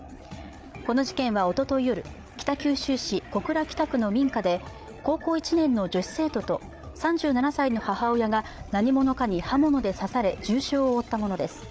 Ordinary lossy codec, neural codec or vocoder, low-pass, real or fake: none; codec, 16 kHz, 8 kbps, FreqCodec, larger model; none; fake